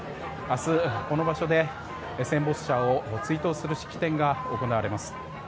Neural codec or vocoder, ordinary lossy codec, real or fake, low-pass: none; none; real; none